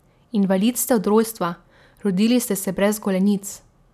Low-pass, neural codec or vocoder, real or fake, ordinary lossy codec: 14.4 kHz; none; real; none